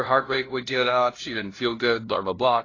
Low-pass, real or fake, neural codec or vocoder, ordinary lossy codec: 7.2 kHz; fake; codec, 16 kHz, 0.5 kbps, FunCodec, trained on LibriTTS, 25 frames a second; AAC, 32 kbps